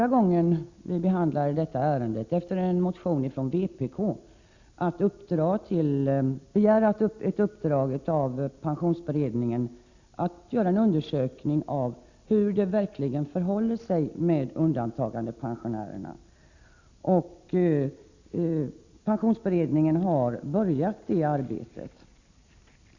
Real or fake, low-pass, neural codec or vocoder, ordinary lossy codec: real; 7.2 kHz; none; Opus, 64 kbps